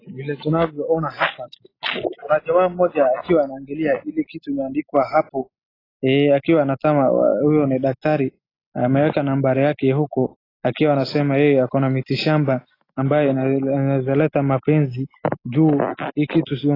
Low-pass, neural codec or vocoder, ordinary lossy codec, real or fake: 5.4 kHz; none; AAC, 24 kbps; real